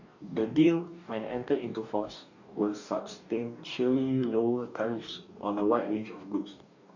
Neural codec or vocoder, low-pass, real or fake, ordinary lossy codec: codec, 44.1 kHz, 2.6 kbps, DAC; 7.2 kHz; fake; none